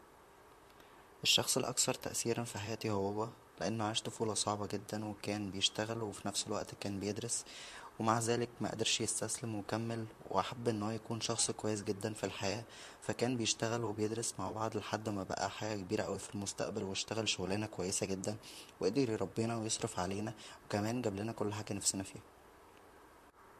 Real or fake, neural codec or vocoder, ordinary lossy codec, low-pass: fake; vocoder, 44.1 kHz, 128 mel bands, Pupu-Vocoder; MP3, 64 kbps; 14.4 kHz